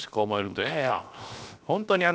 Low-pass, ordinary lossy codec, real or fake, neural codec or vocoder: none; none; fake; codec, 16 kHz, 0.7 kbps, FocalCodec